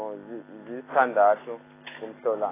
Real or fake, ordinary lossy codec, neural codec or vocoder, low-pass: real; AAC, 16 kbps; none; 3.6 kHz